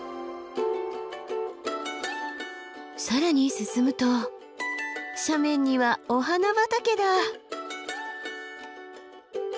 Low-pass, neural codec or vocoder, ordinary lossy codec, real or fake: none; none; none; real